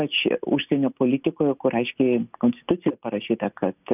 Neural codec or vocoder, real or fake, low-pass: none; real; 3.6 kHz